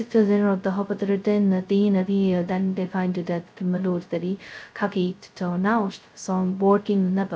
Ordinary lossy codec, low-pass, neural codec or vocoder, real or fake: none; none; codec, 16 kHz, 0.2 kbps, FocalCodec; fake